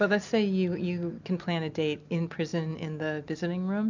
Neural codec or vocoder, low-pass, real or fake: none; 7.2 kHz; real